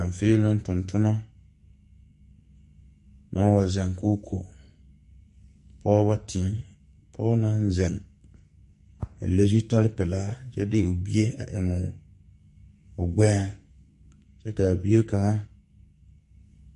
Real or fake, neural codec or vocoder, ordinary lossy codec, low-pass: fake; codec, 44.1 kHz, 2.6 kbps, SNAC; MP3, 48 kbps; 14.4 kHz